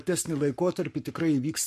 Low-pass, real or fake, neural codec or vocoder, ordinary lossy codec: 14.4 kHz; fake; codec, 44.1 kHz, 7.8 kbps, Pupu-Codec; MP3, 64 kbps